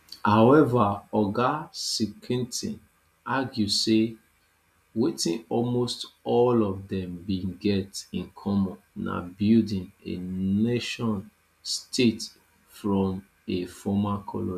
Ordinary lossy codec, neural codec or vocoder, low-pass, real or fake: none; none; 14.4 kHz; real